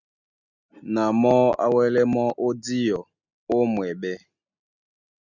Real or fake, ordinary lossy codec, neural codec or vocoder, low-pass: real; Opus, 64 kbps; none; 7.2 kHz